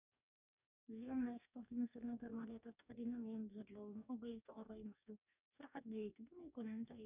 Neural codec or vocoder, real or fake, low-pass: codec, 44.1 kHz, 2.6 kbps, DAC; fake; 3.6 kHz